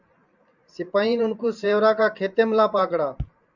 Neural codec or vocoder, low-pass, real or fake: vocoder, 44.1 kHz, 128 mel bands every 512 samples, BigVGAN v2; 7.2 kHz; fake